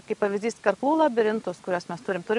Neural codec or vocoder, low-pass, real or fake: none; 10.8 kHz; real